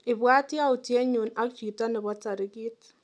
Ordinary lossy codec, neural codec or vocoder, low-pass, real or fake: none; none; none; real